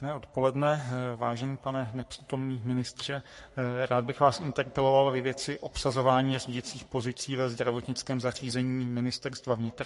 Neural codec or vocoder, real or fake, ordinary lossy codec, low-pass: codec, 44.1 kHz, 3.4 kbps, Pupu-Codec; fake; MP3, 48 kbps; 14.4 kHz